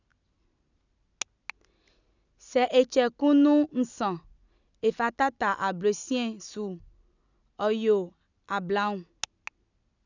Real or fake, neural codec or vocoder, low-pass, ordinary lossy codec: real; none; 7.2 kHz; none